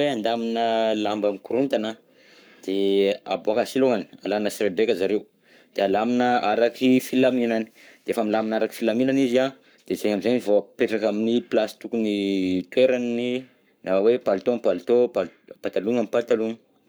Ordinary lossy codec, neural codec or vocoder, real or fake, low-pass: none; codec, 44.1 kHz, 7.8 kbps, Pupu-Codec; fake; none